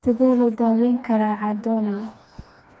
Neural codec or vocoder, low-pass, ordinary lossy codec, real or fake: codec, 16 kHz, 2 kbps, FreqCodec, smaller model; none; none; fake